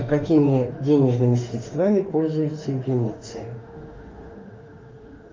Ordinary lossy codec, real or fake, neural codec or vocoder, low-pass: Opus, 24 kbps; fake; autoencoder, 48 kHz, 32 numbers a frame, DAC-VAE, trained on Japanese speech; 7.2 kHz